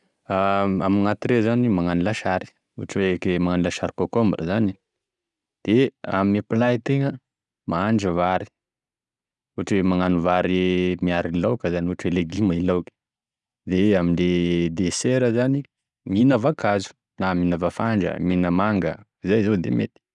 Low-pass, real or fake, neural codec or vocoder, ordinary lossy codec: 10.8 kHz; real; none; none